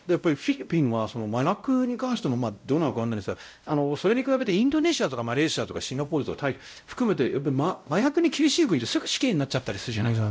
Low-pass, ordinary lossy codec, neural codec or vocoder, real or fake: none; none; codec, 16 kHz, 0.5 kbps, X-Codec, WavLM features, trained on Multilingual LibriSpeech; fake